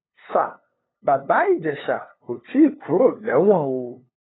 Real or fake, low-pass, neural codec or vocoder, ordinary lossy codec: fake; 7.2 kHz; codec, 16 kHz, 2 kbps, FunCodec, trained on LibriTTS, 25 frames a second; AAC, 16 kbps